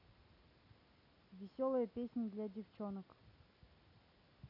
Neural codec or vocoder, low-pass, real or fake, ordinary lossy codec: none; 5.4 kHz; real; none